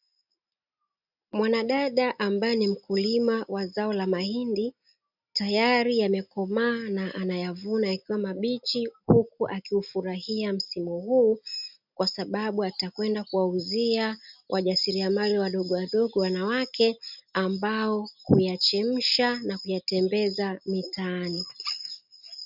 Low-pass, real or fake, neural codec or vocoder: 5.4 kHz; real; none